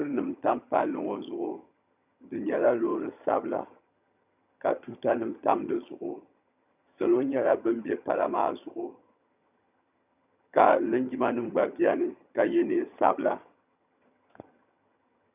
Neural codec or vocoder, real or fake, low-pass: vocoder, 22.05 kHz, 80 mel bands, HiFi-GAN; fake; 3.6 kHz